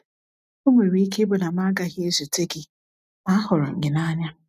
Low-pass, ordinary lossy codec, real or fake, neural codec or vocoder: 14.4 kHz; none; real; none